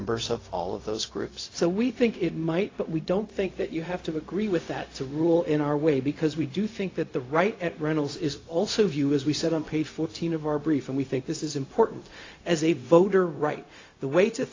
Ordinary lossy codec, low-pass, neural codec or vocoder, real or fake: AAC, 32 kbps; 7.2 kHz; codec, 16 kHz, 0.4 kbps, LongCat-Audio-Codec; fake